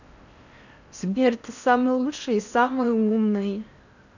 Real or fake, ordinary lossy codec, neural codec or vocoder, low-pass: fake; none; codec, 16 kHz in and 24 kHz out, 0.6 kbps, FocalCodec, streaming, 4096 codes; 7.2 kHz